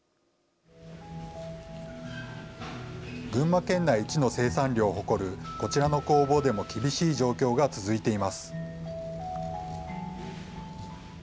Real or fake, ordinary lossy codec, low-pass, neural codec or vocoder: real; none; none; none